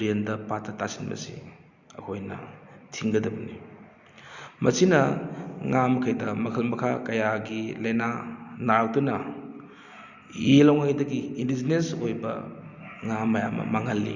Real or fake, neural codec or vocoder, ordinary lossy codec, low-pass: real; none; Opus, 64 kbps; 7.2 kHz